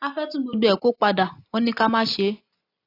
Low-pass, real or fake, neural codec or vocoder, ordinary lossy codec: 5.4 kHz; real; none; AAC, 32 kbps